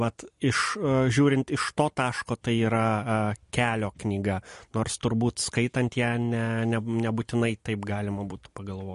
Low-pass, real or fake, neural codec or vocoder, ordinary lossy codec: 10.8 kHz; real; none; MP3, 48 kbps